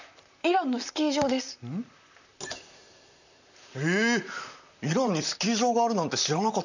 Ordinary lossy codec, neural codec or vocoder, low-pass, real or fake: none; vocoder, 44.1 kHz, 128 mel bands, Pupu-Vocoder; 7.2 kHz; fake